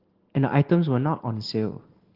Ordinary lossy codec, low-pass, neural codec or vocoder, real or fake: Opus, 16 kbps; 5.4 kHz; none; real